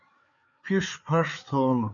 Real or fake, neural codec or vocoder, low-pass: fake; codec, 16 kHz, 4 kbps, FreqCodec, larger model; 7.2 kHz